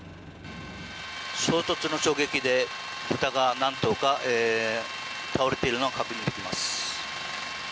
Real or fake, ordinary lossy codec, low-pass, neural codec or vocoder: real; none; none; none